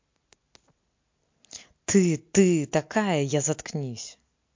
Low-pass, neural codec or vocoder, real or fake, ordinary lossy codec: 7.2 kHz; none; real; MP3, 48 kbps